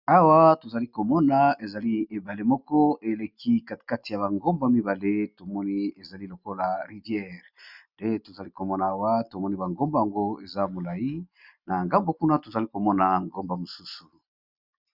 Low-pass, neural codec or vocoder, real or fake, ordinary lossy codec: 5.4 kHz; none; real; Opus, 64 kbps